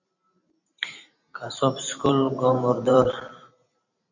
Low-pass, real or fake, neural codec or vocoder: 7.2 kHz; fake; vocoder, 44.1 kHz, 128 mel bands every 512 samples, BigVGAN v2